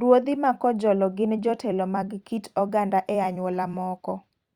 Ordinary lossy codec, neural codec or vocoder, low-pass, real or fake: Opus, 64 kbps; vocoder, 44.1 kHz, 128 mel bands every 256 samples, BigVGAN v2; 19.8 kHz; fake